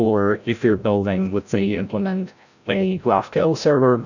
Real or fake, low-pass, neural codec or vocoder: fake; 7.2 kHz; codec, 16 kHz, 0.5 kbps, FreqCodec, larger model